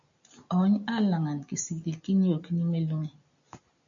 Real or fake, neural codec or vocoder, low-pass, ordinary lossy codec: real; none; 7.2 kHz; MP3, 64 kbps